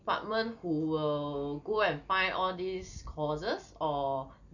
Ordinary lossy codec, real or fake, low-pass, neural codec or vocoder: none; real; 7.2 kHz; none